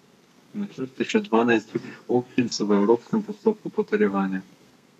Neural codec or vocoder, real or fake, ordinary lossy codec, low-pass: codec, 32 kHz, 1.9 kbps, SNAC; fake; none; 14.4 kHz